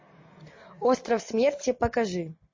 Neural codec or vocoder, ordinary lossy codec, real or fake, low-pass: none; MP3, 32 kbps; real; 7.2 kHz